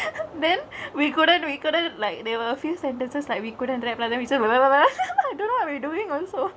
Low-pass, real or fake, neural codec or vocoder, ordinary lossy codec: none; real; none; none